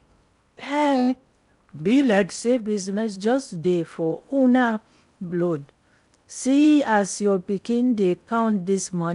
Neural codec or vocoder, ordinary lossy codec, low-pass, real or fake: codec, 16 kHz in and 24 kHz out, 0.6 kbps, FocalCodec, streaming, 4096 codes; none; 10.8 kHz; fake